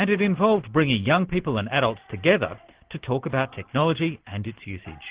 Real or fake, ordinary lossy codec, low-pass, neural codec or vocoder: real; Opus, 16 kbps; 3.6 kHz; none